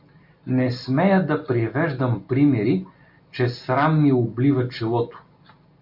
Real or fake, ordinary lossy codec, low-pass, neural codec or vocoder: real; MP3, 48 kbps; 5.4 kHz; none